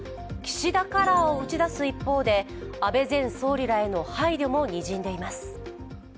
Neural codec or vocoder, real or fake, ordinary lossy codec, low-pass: none; real; none; none